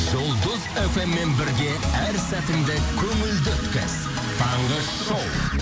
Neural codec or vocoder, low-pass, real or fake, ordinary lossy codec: none; none; real; none